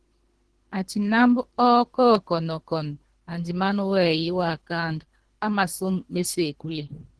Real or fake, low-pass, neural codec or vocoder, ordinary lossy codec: fake; 10.8 kHz; codec, 24 kHz, 3 kbps, HILCodec; Opus, 16 kbps